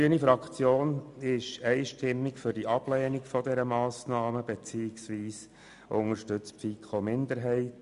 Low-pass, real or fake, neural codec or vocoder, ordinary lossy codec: 10.8 kHz; real; none; none